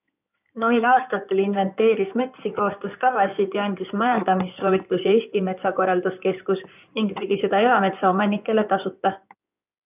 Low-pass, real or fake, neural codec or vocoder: 3.6 kHz; fake; codec, 16 kHz in and 24 kHz out, 2.2 kbps, FireRedTTS-2 codec